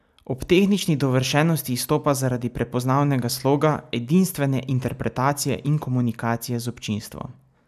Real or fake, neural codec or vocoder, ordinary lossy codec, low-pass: real; none; none; 14.4 kHz